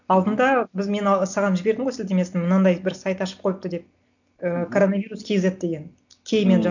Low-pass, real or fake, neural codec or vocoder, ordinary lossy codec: 7.2 kHz; real; none; none